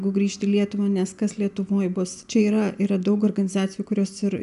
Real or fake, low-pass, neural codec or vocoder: real; 10.8 kHz; none